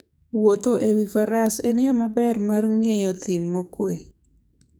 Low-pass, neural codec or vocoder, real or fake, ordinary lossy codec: none; codec, 44.1 kHz, 2.6 kbps, SNAC; fake; none